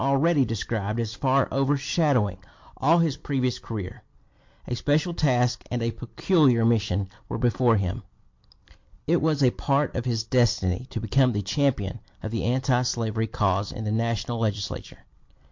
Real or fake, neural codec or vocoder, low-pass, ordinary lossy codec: real; none; 7.2 kHz; MP3, 48 kbps